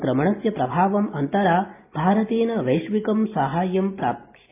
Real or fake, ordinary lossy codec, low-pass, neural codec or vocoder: real; AAC, 24 kbps; 3.6 kHz; none